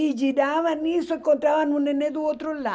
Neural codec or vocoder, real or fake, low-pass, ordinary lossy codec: none; real; none; none